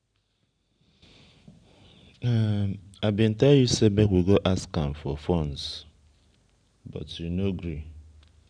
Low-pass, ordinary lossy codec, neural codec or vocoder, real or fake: 9.9 kHz; none; none; real